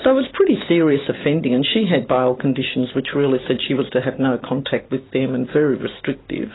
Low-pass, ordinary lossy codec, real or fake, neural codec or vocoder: 7.2 kHz; AAC, 16 kbps; real; none